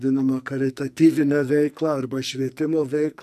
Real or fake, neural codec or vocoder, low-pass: fake; codec, 44.1 kHz, 2.6 kbps, SNAC; 14.4 kHz